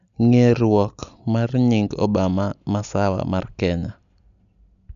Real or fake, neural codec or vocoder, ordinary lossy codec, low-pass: real; none; none; 7.2 kHz